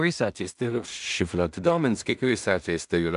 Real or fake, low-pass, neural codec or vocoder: fake; 10.8 kHz; codec, 16 kHz in and 24 kHz out, 0.4 kbps, LongCat-Audio-Codec, two codebook decoder